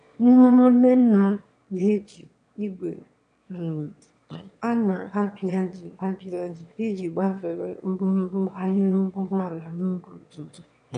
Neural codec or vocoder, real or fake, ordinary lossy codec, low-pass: autoencoder, 22.05 kHz, a latent of 192 numbers a frame, VITS, trained on one speaker; fake; none; 9.9 kHz